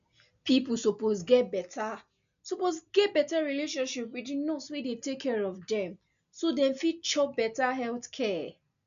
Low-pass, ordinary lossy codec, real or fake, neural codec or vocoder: 7.2 kHz; none; real; none